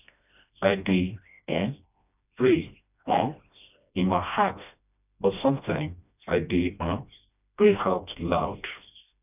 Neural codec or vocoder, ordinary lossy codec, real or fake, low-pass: codec, 16 kHz, 1 kbps, FreqCodec, smaller model; none; fake; 3.6 kHz